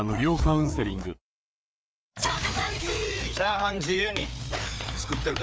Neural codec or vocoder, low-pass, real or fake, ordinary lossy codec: codec, 16 kHz, 4 kbps, FreqCodec, larger model; none; fake; none